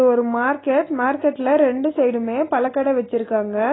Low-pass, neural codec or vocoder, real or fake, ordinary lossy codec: 7.2 kHz; none; real; AAC, 16 kbps